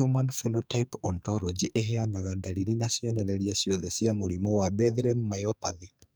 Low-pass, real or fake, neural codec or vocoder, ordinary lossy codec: none; fake; codec, 44.1 kHz, 2.6 kbps, SNAC; none